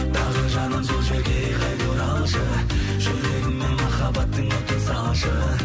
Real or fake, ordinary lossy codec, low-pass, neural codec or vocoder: real; none; none; none